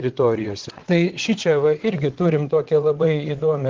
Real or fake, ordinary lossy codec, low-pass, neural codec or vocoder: fake; Opus, 16 kbps; 7.2 kHz; vocoder, 22.05 kHz, 80 mel bands, WaveNeXt